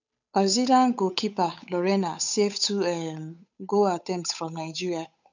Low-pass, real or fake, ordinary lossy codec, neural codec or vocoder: 7.2 kHz; fake; none; codec, 16 kHz, 8 kbps, FunCodec, trained on Chinese and English, 25 frames a second